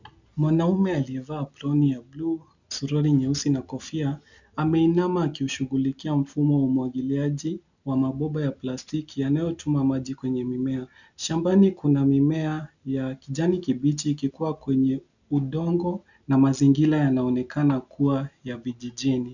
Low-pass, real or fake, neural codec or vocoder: 7.2 kHz; real; none